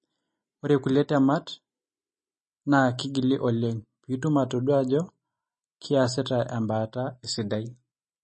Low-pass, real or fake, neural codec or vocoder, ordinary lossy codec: 10.8 kHz; real; none; MP3, 32 kbps